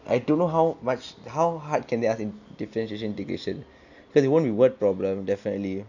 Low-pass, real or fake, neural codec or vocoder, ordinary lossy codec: 7.2 kHz; real; none; none